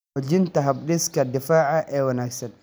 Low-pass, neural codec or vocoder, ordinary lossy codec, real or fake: none; none; none; real